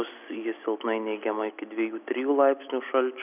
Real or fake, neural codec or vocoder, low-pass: real; none; 3.6 kHz